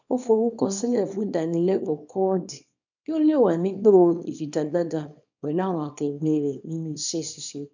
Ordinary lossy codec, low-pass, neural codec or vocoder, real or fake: none; 7.2 kHz; codec, 24 kHz, 0.9 kbps, WavTokenizer, small release; fake